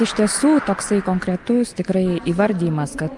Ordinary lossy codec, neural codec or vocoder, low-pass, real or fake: Opus, 32 kbps; none; 10.8 kHz; real